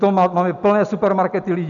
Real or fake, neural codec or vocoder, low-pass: real; none; 7.2 kHz